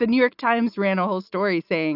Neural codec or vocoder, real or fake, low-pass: none; real; 5.4 kHz